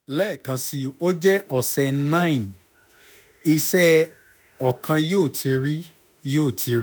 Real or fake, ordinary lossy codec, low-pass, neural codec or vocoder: fake; none; none; autoencoder, 48 kHz, 32 numbers a frame, DAC-VAE, trained on Japanese speech